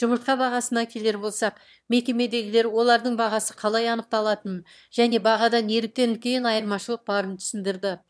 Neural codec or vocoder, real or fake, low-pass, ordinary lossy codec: autoencoder, 22.05 kHz, a latent of 192 numbers a frame, VITS, trained on one speaker; fake; none; none